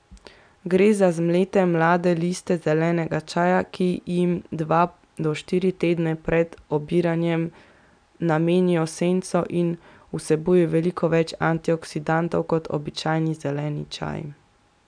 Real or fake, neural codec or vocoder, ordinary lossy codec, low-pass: real; none; none; 9.9 kHz